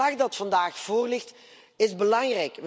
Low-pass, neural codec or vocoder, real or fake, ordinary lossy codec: none; none; real; none